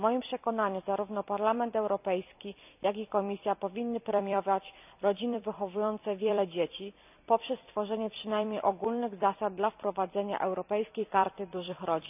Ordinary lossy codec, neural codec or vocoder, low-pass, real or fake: none; vocoder, 44.1 kHz, 128 mel bands every 256 samples, BigVGAN v2; 3.6 kHz; fake